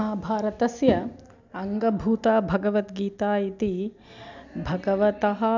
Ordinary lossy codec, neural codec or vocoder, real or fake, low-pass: none; none; real; 7.2 kHz